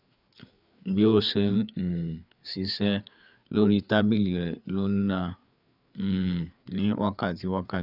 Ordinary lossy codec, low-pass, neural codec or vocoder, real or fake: none; 5.4 kHz; codec, 16 kHz, 4 kbps, FreqCodec, larger model; fake